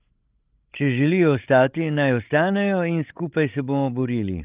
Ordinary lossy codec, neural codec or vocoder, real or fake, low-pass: Opus, 32 kbps; codec, 16 kHz, 16 kbps, FreqCodec, larger model; fake; 3.6 kHz